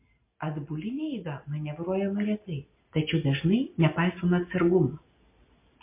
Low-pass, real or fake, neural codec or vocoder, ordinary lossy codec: 3.6 kHz; real; none; MP3, 24 kbps